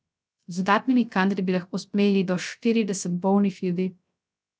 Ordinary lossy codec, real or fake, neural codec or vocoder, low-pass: none; fake; codec, 16 kHz, 0.3 kbps, FocalCodec; none